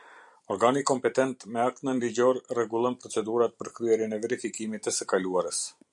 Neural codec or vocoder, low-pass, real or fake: vocoder, 44.1 kHz, 128 mel bands every 256 samples, BigVGAN v2; 10.8 kHz; fake